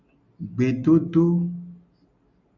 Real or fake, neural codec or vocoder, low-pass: real; none; 7.2 kHz